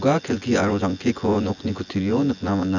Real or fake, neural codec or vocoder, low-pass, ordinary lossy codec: fake; vocoder, 24 kHz, 100 mel bands, Vocos; 7.2 kHz; none